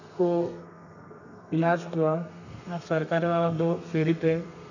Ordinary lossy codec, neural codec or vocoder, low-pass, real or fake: none; codec, 32 kHz, 1.9 kbps, SNAC; 7.2 kHz; fake